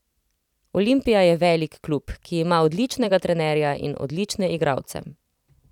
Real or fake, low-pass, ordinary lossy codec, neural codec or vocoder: fake; 19.8 kHz; none; vocoder, 44.1 kHz, 128 mel bands every 256 samples, BigVGAN v2